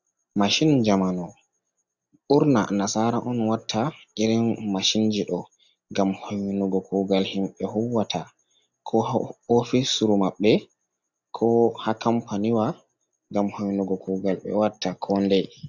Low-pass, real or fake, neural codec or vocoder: 7.2 kHz; real; none